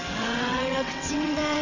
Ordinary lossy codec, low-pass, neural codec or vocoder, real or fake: none; 7.2 kHz; vocoder, 22.05 kHz, 80 mel bands, WaveNeXt; fake